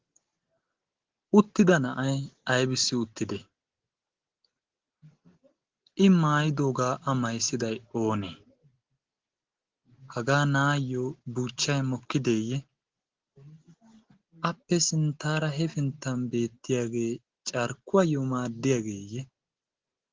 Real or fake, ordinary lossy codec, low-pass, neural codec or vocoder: real; Opus, 16 kbps; 7.2 kHz; none